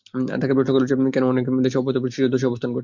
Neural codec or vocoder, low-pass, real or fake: none; 7.2 kHz; real